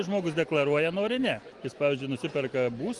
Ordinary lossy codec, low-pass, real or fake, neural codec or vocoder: Opus, 24 kbps; 10.8 kHz; real; none